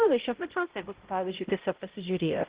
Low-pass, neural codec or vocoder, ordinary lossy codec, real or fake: 3.6 kHz; codec, 16 kHz, 0.5 kbps, X-Codec, HuBERT features, trained on balanced general audio; Opus, 16 kbps; fake